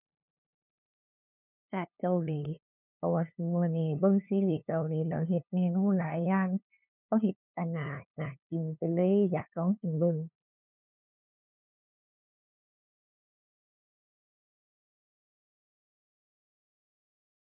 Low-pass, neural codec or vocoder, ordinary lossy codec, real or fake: 3.6 kHz; codec, 16 kHz, 2 kbps, FunCodec, trained on LibriTTS, 25 frames a second; none; fake